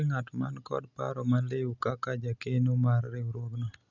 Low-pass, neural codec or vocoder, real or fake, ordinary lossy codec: 7.2 kHz; none; real; none